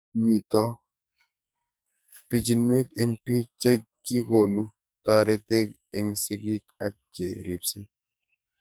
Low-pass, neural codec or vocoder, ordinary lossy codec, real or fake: none; codec, 44.1 kHz, 2.6 kbps, SNAC; none; fake